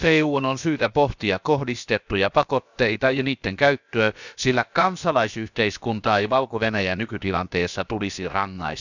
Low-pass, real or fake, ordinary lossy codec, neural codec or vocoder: 7.2 kHz; fake; none; codec, 16 kHz, about 1 kbps, DyCAST, with the encoder's durations